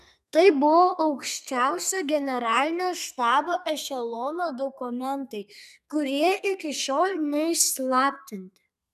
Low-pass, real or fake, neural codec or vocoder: 14.4 kHz; fake; codec, 32 kHz, 1.9 kbps, SNAC